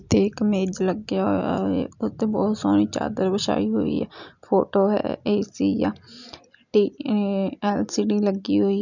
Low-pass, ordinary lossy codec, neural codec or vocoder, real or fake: 7.2 kHz; none; none; real